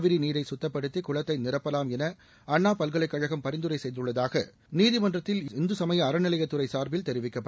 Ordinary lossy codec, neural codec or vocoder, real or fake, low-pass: none; none; real; none